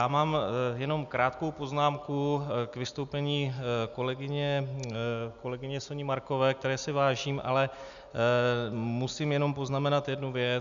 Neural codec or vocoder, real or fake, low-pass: none; real; 7.2 kHz